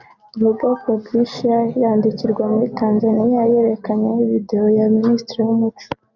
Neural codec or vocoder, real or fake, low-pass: none; real; 7.2 kHz